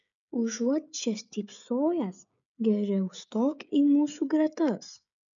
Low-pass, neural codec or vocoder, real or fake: 7.2 kHz; codec, 16 kHz, 16 kbps, FreqCodec, smaller model; fake